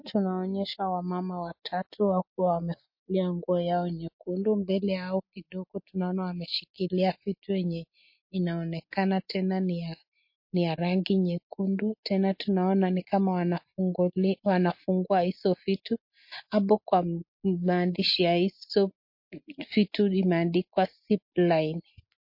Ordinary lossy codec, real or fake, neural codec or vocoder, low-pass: MP3, 32 kbps; real; none; 5.4 kHz